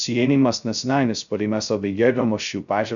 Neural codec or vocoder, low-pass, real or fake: codec, 16 kHz, 0.2 kbps, FocalCodec; 7.2 kHz; fake